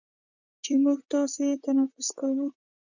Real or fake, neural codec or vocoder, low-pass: fake; vocoder, 44.1 kHz, 128 mel bands, Pupu-Vocoder; 7.2 kHz